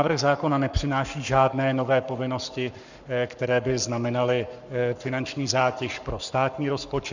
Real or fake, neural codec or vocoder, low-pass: fake; codec, 44.1 kHz, 7.8 kbps, Pupu-Codec; 7.2 kHz